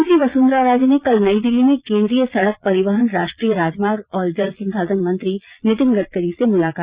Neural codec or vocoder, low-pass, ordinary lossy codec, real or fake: vocoder, 22.05 kHz, 80 mel bands, Vocos; 3.6 kHz; Opus, 64 kbps; fake